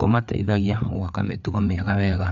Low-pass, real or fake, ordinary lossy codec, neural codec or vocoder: 7.2 kHz; fake; none; codec, 16 kHz, 4 kbps, FreqCodec, larger model